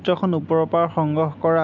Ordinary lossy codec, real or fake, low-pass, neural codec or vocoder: MP3, 64 kbps; real; 7.2 kHz; none